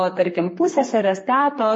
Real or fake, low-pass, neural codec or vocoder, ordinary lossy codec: fake; 10.8 kHz; codec, 32 kHz, 1.9 kbps, SNAC; MP3, 32 kbps